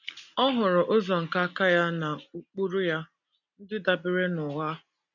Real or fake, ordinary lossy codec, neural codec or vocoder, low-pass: real; none; none; 7.2 kHz